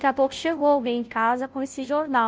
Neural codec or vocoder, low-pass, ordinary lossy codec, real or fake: codec, 16 kHz, 0.5 kbps, FunCodec, trained on Chinese and English, 25 frames a second; none; none; fake